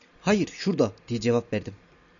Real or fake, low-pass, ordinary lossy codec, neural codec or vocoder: real; 7.2 kHz; MP3, 96 kbps; none